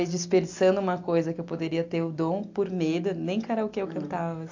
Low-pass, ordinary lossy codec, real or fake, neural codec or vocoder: 7.2 kHz; AAC, 48 kbps; real; none